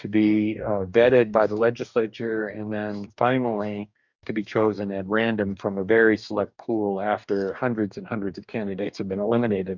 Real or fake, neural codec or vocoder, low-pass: fake; codec, 44.1 kHz, 2.6 kbps, DAC; 7.2 kHz